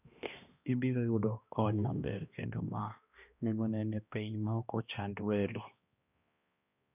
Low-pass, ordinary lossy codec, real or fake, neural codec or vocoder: 3.6 kHz; none; fake; codec, 16 kHz, 1 kbps, X-Codec, HuBERT features, trained on balanced general audio